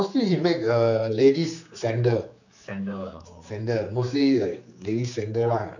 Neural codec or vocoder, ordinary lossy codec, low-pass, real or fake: codec, 16 kHz, 4 kbps, X-Codec, HuBERT features, trained on general audio; none; 7.2 kHz; fake